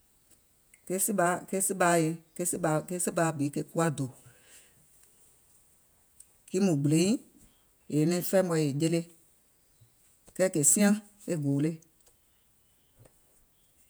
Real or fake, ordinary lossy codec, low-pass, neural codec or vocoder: fake; none; none; vocoder, 48 kHz, 128 mel bands, Vocos